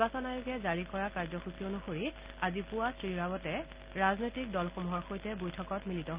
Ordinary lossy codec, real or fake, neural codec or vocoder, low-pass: Opus, 24 kbps; real; none; 3.6 kHz